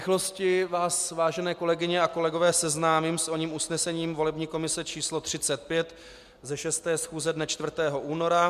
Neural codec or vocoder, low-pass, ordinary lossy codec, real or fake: none; 14.4 kHz; MP3, 96 kbps; real